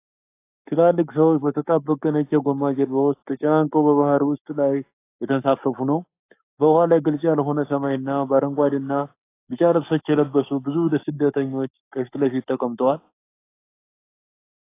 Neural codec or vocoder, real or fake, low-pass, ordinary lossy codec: codec, 44.1 kHz, 7.8 kbps, Pupu-Codec; fake; 3.6 kHz; AAC, 24 kbps